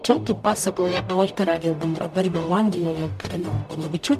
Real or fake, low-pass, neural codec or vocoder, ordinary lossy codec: fake; 14.4 kHz; codec, 44.1 kHz, 0.9 kbps, DAC; MP3, 96 kbps